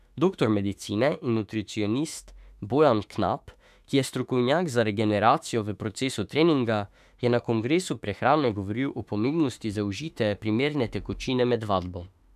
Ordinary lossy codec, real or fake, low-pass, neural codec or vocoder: none; fake; 14.4 kHz; autoencoder, 48 kHz, 32 numbers a frame, DAC-VAE, trained on Japanese speech